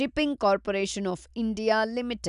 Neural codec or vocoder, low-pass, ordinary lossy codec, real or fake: none; 10.8 kHz; none; real